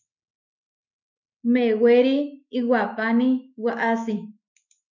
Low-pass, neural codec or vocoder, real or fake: 7.2 kHz; autoencoder, 48 kHz, 128 numbers a frame, DAC-VAE, trained on Japanese speech; fake